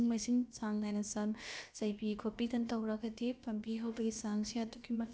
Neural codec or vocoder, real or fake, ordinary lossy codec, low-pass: codec, 16 kHz, about 1 kbps, DyCAST, with the encoder's durations; fake; none; none